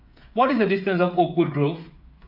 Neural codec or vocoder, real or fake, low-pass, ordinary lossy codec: codec, 16 kHz, 8 kbps, FreqCodec, smaller model; fake; 5.4 kHz; AAC, 48 kbps